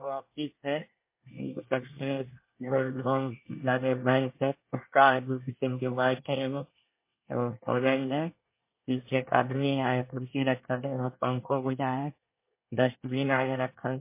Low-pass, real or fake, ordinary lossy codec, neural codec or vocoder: 3.6 kHz; fake; MP3, 24 kbps; codec, 24 kHz, 1 kbps, SNAC